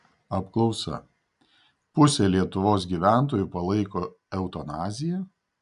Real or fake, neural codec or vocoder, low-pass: real; none; 10.8 kHz